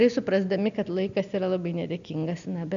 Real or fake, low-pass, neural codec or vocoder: real; 7.2 kHz; none